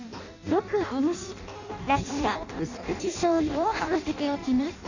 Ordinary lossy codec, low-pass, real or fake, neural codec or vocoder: none; 7.2 kHz; fake; codec, 16 kHz in and 24 kHz out, 0.6 kbps, FireRedTTS-2 codec